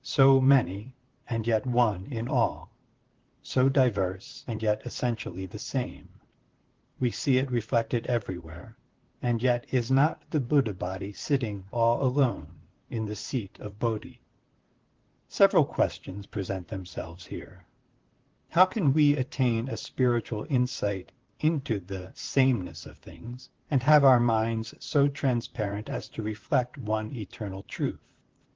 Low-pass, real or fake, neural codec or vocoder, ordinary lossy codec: 7.2 kHz; fake; vocoder, 44.1 kHz, 128 mel bands, Pupu-Vocoder; Opus, 16 kbps